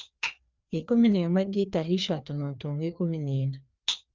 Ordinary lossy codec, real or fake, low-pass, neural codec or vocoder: Opus, 32 kbps; fake; 7.2 kHz; codec, 16 kHz, 2 kbps, FreqCodec, larger model